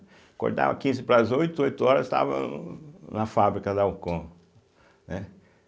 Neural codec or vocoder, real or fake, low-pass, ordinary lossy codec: none; real; none; none